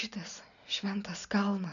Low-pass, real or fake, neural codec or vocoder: 7.2 kHz; real; none